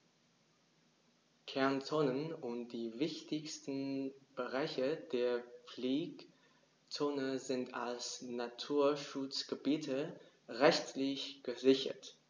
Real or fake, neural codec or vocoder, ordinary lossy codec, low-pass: real; none; none; none